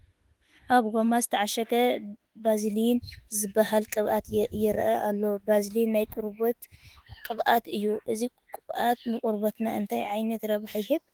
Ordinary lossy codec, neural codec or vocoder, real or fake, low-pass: Opus, 24 kbps; autoencoder, 48 kHz, 32 numbers a frame, DAC-VAE, trained on Japanese speech; fake; 19.8 kHz